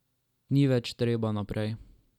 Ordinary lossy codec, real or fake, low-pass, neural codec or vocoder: none; real; 19.8 kHz; none